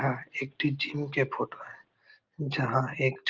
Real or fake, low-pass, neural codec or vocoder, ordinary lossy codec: real; 7.2 kHz; none; Opus, 32 kbps